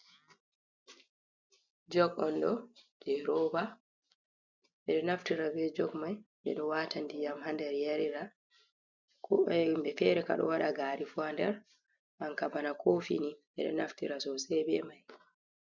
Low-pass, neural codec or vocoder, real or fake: 7.2 kHz; none; real